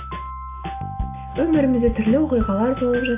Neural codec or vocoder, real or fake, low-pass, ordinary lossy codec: none; real; 3.6 kHz; AAC, 24 kbps